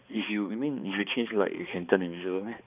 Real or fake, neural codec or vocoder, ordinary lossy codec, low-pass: fake; codec, 16 kHz, 2 kbps, X-Codec, HuBERT features, trained on balanced general audio; none; 3.6 kHz